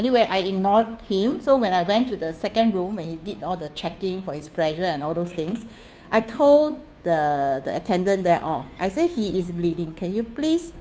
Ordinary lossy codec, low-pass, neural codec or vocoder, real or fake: none; none; codec, 16 kHz, 2 kbps, FunCodec, trained on Chinese and English, 25 frames a second; fake